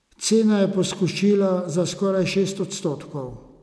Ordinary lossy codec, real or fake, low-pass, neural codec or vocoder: none; real; none; none